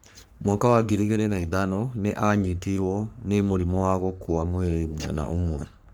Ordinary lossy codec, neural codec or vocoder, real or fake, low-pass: none; codec, 44.1 kHz, 3.4 kbps, Pupu-Codec; fake; none